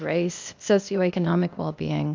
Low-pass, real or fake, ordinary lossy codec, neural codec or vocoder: 7.2 kHz; fake; MP3, 64 kbps; codec, 16 kHz, 0.8 kbps, ZipCodec